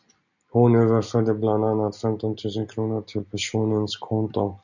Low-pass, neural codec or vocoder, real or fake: 7.2 kHz; none; real